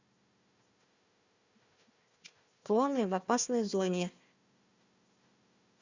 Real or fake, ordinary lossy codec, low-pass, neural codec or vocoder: fake; Opus, 64 kbps; 7.2 kHz; codec, 16 kHz, 1 kbps, FunCodec, trained on Chinese and English, 50 frames a second